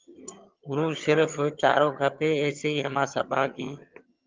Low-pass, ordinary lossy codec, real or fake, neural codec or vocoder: 7.2 kHz; Opus, 24 kbps; fake; vocoder, 22.05 kHz, 80 mel bands, HiFi-GAN